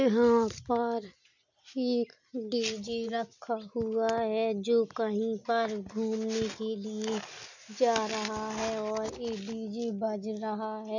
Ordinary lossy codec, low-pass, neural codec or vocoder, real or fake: none; 7.2 kHz; none; real